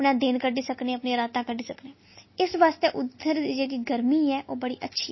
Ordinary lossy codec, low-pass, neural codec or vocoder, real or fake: MP3, 24 kbps; 7.2 kHz; none; real